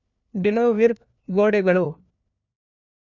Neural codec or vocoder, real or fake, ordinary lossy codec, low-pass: codec, 16 kHz, 1 kbps, FunCodec, trained on LibriTTS, 50 frames a second; fake; none; 7.2 kHz